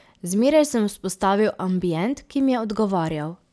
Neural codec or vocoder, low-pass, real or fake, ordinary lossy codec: none; none; real; none